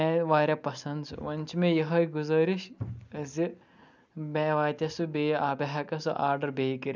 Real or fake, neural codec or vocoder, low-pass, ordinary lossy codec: real; none; 7.2 kHz; none